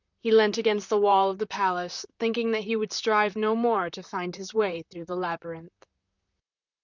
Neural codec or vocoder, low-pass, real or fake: vocoder, 44.1 kHz, 128 mel bands, Pupu-Vocoder; 7.2 kHz; fake